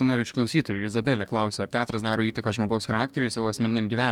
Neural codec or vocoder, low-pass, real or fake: codec, 44.1 kHz, 2.6 kbps, DAC; 19.8 kHz; fake